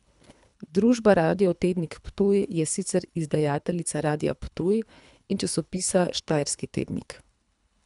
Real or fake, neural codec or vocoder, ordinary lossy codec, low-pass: fake; codec, 24 kHz, 3 kbps, HILCodec; none; 10.8 kHz